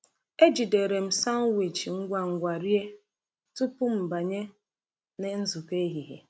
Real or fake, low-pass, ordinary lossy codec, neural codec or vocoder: real; none; none; none